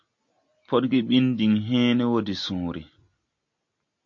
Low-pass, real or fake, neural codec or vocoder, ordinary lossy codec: 7.2 kHz; real; none; AAC, 48 kbps